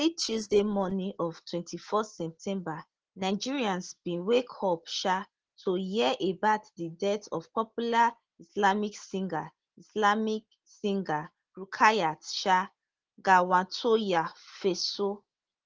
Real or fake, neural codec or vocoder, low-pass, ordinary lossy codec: real; none; 7.2 kHz; Opus, 16 kbps